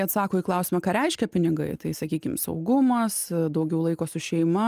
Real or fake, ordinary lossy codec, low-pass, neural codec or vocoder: real; Opus, 32 kbps; 14.4 kHz; none